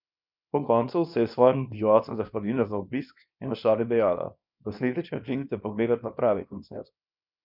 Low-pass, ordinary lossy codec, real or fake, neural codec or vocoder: 5.4 kHz; none; fake; codec, 24 kHz, 0.9 kbps, WavTokenizer, small release